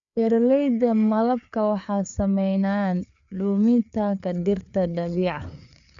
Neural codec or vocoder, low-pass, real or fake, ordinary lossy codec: codec, 16 kHz, 4 kbps, FreqCodec, larger model; 7.2 kHz; fake; none